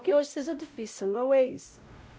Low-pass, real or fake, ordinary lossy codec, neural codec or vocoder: none; fake; none; codec, 16 kHz, 0.5 kbps, X-Codec, WavLM features, trained on Multilingual LibriSpeech